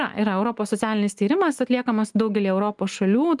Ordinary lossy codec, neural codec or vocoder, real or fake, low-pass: Opus, 32 kbps; none; real; 10.8 kHz